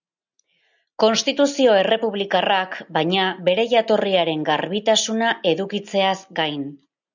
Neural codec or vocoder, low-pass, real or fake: none; 7.2 kHz; real